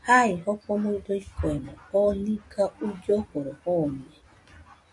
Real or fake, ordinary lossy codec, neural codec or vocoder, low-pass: real; MP3, 64 kbps; none; 10.8 kHz